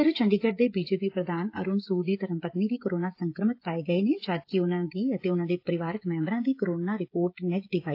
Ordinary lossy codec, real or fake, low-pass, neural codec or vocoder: AAC, 32 kbps; fake; 5.4 kHz; codec, 16 kHz, 16 kbps, FreqCodec, smaller model